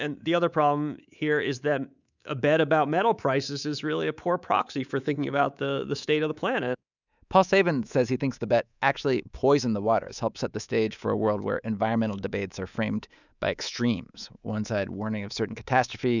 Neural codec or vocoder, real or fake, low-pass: codec, 24 kHz, 3.1 kbps, DualCodec; fake; 7.2 kHz